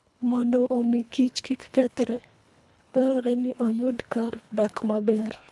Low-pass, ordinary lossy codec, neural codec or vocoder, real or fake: none; none; codec, 24 kHz, 1.5 kbps, HILCodec; fake